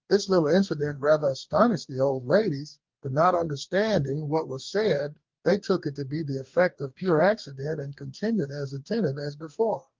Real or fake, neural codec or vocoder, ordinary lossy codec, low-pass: fake; codec, 44.1 kHz, 2.6 kbps, DAC; Opus, 32 kbps; 7.2 kHz